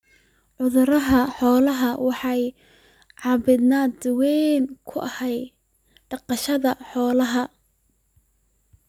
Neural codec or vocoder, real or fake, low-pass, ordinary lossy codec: none; real; 19.8 kHz; none